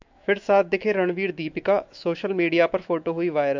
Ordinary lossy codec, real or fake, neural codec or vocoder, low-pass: MP3, 64 kbps; fake; autoencoder, 48 kHz, 128 numbers a frame, DAC-VAE, trained on Japanese speech; 7.2 kHz